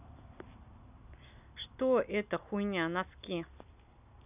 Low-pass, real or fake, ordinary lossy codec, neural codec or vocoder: 3.6 kHz; real; none; none